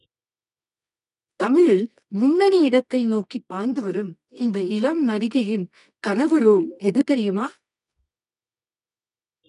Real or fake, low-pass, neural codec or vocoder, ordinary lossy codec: fake; 10.8 kHz; codec, 24 kHz, 0.9 kbps, WavTokenizer, medium music audio release; none